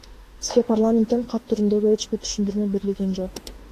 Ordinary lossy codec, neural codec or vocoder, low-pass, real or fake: AAC, 48 kbps; autoencoder, 48 kHz, 32 numbers a frame, DAC-VAE, trained on Japanese speech; 14.4 kHz; fake